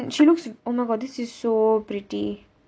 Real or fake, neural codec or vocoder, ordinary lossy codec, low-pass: real; none; none; none